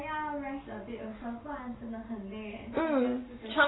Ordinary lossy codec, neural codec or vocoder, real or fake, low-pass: AAC, 16 kbps; none; real; 7.2 kHz